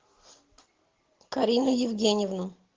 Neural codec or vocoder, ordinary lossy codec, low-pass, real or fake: none; Opus, 16 kbps; 7.2 kHz; real